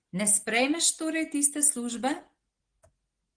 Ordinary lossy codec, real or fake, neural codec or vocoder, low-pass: Opus, 16 kbps; real; none; 9.9 kHz